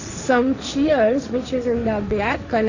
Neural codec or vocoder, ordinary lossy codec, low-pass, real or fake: codec, 16 kHz, 1.1 kbps, Voila-Tokenizer; none; 7.2 kHz; fake